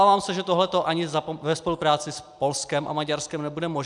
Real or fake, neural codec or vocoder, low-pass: real; none; 10.8 kHz